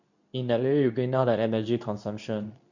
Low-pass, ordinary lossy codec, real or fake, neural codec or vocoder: 7.2 kHz; none; fake; codec, 24 kHz, 0.9 kbps, WavTokenizer, medium speech release version 2